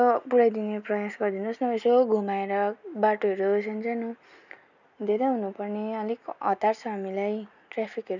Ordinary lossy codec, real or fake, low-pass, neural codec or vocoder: none; real; 7.2 kHz; none